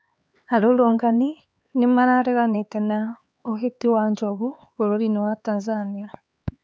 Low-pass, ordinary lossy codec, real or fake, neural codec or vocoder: none; none; fake; codec, 16 kHz, 4 kbps, X-Codec, HuBERT features, trained on LibriSpeech